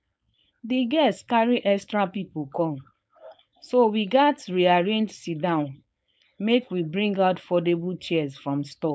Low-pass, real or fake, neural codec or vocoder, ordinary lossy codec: none; fake; codec, 16 kHz, 4.8 kbps, FACodec; none